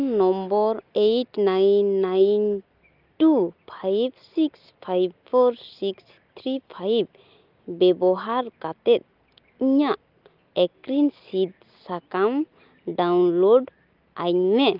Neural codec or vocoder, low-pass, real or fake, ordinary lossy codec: none; 5.4 kHz; real; Opus, 32 kbps